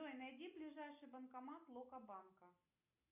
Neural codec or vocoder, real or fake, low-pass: none; real; 3.6 kHz